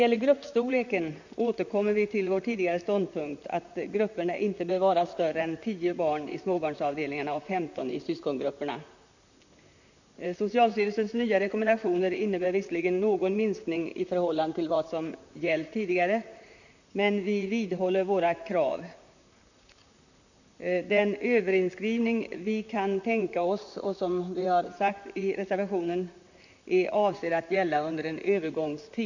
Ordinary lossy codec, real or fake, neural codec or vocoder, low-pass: none; fake; vocoder, 44.1 kHz, 128 mel bands, Pupu-Vocoder; 7.2 kHz